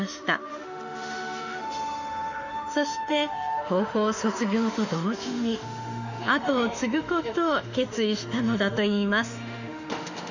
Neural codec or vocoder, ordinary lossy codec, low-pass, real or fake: autoencoder, 48 kHz, 32 numbers a frame, DAC-VAE, trained on Japanese speech; none; 7.2 kHz; fake